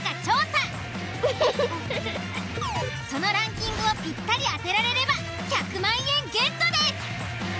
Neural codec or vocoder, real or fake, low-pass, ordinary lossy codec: none; real; none; none